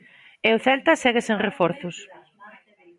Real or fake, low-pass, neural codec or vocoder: fake; 10.8 kHz; vocoder, 44.1 kHz, 128 mel bands every 512 samples, BigVGAN v2